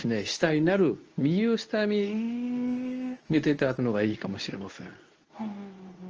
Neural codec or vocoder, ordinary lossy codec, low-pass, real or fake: codec, 24 kHz, 0.9 kbps, WavTokenizer, medium speech release version 2; Opus, 24 kbps; 7.2 kHz; fake